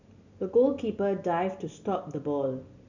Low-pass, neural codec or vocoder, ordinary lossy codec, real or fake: 7.2 kHz; none; none; real